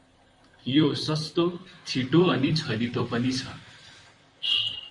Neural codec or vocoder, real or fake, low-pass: vocoder, 44.1 kHz, 128 mel bands, Pupu-Vocoder; fake; 10.8 kHz